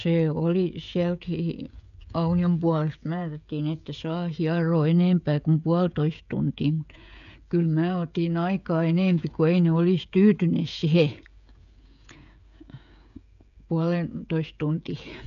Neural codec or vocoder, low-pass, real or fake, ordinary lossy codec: codec, 16 kHz, 16 kbps, FreqCodec, smaller model; 7.2 kHz; fake; none